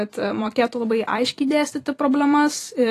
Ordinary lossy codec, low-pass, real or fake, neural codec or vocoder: AAC, 48 kbps; 14.4 kHz; fake; vocoder, 44.1 kHz, 128 mel bands every 256 samples, BigVGAN v2